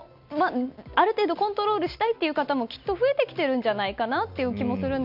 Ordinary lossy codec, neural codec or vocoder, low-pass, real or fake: none; none; 5.4 kHz; real